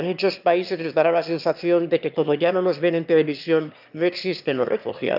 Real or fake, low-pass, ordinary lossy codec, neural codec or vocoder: fake; 5.4 kHz; none; autoencoder, 22.05 kHz, a latent of 192 numbers a frame, VITS, trained on one speaker